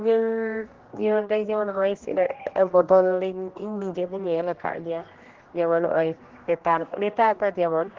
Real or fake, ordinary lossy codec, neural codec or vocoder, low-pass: fake; Opus, 16 kbps; codec, 16 kHz, 1 kbps, X-Codec, HuBERT features, trained on general audio; 7.2 kHz